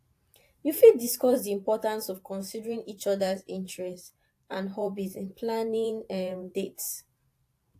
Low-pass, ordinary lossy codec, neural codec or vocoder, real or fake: 14.4 kHz; AAC, 64 kbps; vocoder, 44.1 kHz, 128 mel bands every 512 samples, BigVGAN v2; fake